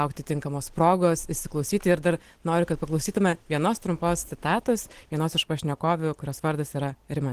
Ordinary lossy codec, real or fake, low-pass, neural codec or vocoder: Opus, 16 kbps; real; 14.4 kHz; none